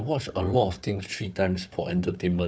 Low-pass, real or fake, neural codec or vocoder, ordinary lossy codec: none; fake; codec, 16 kHz, 4 kbps, FunCodec, trained on Chinese and English, 50 frames a second; none